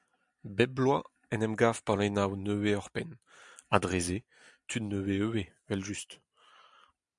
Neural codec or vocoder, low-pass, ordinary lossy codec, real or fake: none; 10.8 kHz; MP3, 96 kbps; real